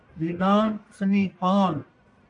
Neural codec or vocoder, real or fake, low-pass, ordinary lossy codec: codec, 44.1 kHz, 1.7 kbps, Pupu-Codec; fake; 10.8 kHz; AAC, 48 kbps